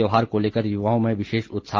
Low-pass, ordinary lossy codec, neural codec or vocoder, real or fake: 7.2 kHz; Opus, 32 kbps; none; real